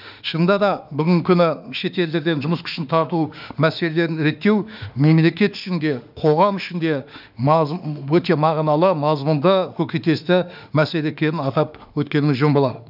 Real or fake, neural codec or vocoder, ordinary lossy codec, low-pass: fake; autoencoder, 48 kHz, 32 numbers a frame, DAC-VAE, trained on Japanese speech; none; 5.4 kHz